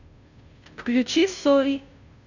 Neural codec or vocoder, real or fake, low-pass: codec, 16 kHz, 0.5 kbps, FunCodec, trained on Chinese and English, 25 frames a second; fake; 7.2 kHz